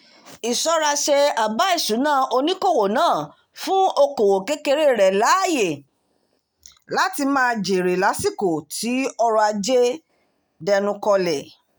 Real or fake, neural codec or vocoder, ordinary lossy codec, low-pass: real; none; none; none